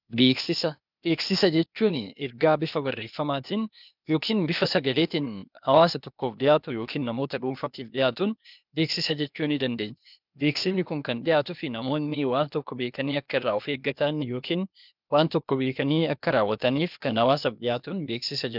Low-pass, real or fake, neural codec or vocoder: 5.4 kHz; fake; codec, 16 kHz, 0.8 kbps, ZipCodec